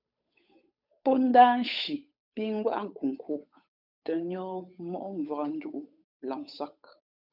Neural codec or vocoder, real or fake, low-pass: codec, 16 kHz, 8 kbps, FunCodec, trained on Chinese and English, 25 frames a second; fake; 5.4 kHz